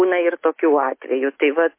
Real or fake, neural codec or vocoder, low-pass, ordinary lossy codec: real; none; 3.6 kHz; MP3, 24 kbps